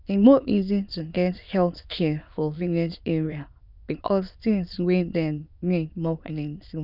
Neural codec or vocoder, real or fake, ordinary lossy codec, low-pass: autoencoder, 22.05 kHz, a latent of 192 numbers a frame, VITS, trained on many speakers; fake; none; 5.4 kHz